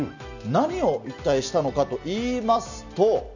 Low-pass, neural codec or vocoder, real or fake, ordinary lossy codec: 7.2 kHz; none; real; none